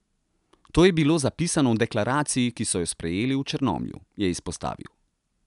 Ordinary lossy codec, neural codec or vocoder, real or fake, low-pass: none; none; real; 10.8 kHz